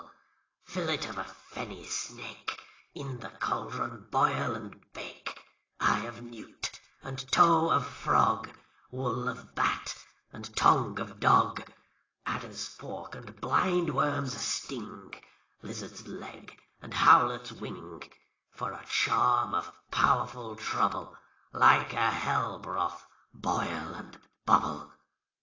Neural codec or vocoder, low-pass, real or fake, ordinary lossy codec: none; 7.2 kHz; real; AAC, 32 kbps